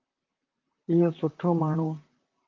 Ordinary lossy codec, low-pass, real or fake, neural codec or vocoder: Opus, 24 kbps; 7.2 kHz; fake; vocoder, 44.1 kHz, 128 mel bands, Pupu-Vocoder